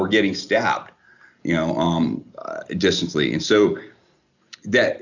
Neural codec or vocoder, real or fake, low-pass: none; real; 7.2 kHz